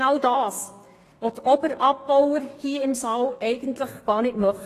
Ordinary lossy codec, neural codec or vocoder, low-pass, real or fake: AAC, 48 kbps; codec, 32 kHz, 1.9 kbps, SNAC; 14.4 kHz; fake